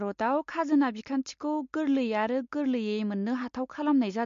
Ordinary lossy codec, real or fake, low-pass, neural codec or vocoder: MP3, 48 kbps; real; 7.2 kHz; none